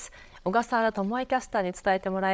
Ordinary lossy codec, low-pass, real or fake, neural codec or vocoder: none; none; fake; codec, 16 kHz, 8 kbps, FreqCodec, larger model